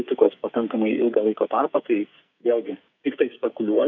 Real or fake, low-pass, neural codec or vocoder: fake; 7.2 kHz; codec, 44.1 kHz, 2.6 kbps, SNAC